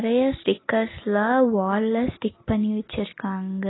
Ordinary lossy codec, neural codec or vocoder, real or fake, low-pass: AAC, 16 kbps; none; real; 7.2 kHz